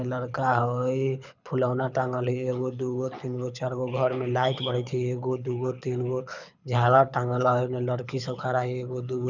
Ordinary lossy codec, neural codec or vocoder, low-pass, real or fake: none; codec, 24 kHz, 6 kbps, HILCodec; 7.2 kHz; fake